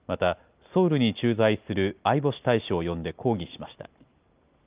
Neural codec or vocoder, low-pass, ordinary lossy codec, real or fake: none; 3.6 kHz; Opus, 24 kbps; real